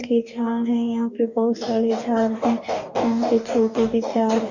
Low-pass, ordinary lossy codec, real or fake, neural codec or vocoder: 7.2 kHz; none; fake; codec, 44.1 kHz, 2.6 kbps, DAC